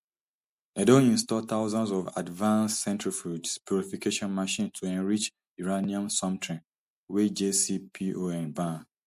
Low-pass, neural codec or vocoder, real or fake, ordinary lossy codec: 14.4 kHz; none; real; MP3, 64 kbps